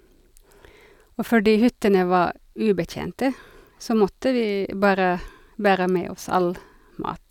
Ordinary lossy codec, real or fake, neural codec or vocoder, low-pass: none; real; none; 19.8 kHz